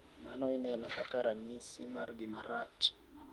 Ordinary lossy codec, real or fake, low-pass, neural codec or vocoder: Opus, 32 kbps; fake; 19.8 kHz; autoencoder, 48 kHz, 32 numbers a frame, DAC-VAE, trained on Japanese speech